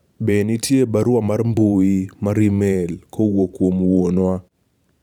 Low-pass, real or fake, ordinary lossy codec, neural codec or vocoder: 19.8 kHz; fake; none; vocoder, 44.1 kHz, 128 mel bands every 512 samples, BigVGAN v2